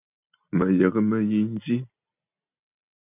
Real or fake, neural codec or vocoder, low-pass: real; none; 3.6 kHz